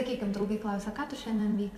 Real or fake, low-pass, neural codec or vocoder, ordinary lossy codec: fake; 14.4 kHz; vocoder, 44.1 kHz, 128 mel bands every 256 samples, BigVGAN v2; MP3, 96 kbps